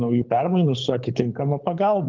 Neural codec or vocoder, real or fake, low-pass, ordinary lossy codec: codec, 24 kHz, 6 kbps, HILCodec; fake; 7.2 kHz; Opus, 32 kbps